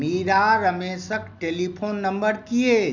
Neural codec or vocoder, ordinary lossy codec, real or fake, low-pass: none; none; real; 7.2 kHz